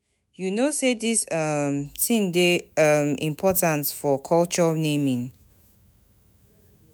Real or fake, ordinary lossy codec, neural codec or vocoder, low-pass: fake; none; autoencoder, 48 kHz, 128 numbers a frame, DAC-VAE, trained on Japanese speech; none